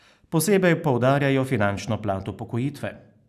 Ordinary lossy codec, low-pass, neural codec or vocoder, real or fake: none; 14.4 kHz; none; real